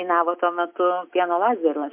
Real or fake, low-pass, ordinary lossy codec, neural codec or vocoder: real; 3.6 kHz; MP3, 32 kbps; none